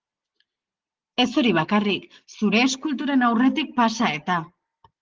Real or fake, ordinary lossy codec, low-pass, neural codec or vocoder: real; Opus, 16 kbps; 7.2 kHz; none